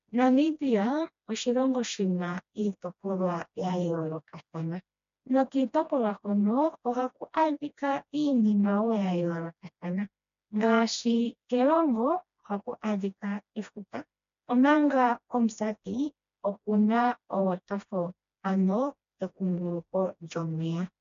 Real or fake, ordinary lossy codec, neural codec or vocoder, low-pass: fake; MP3, 64 kbps; codec, 16 kHz, 1 kbps, FreqCodec, smaller model; 7.2 kHz